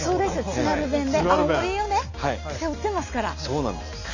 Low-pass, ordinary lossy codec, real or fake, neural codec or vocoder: 7.2 kHz; none; real; none